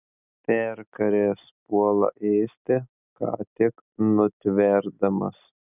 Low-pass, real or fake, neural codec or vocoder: 3.6 kHz; real; none